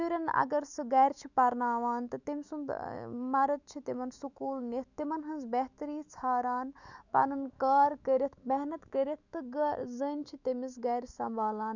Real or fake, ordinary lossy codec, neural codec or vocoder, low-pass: fake; none; autoencoder, 48 kHz, 128 numbers a frame, DAC-VAE, trained on Japanese speech; 7.2 kHz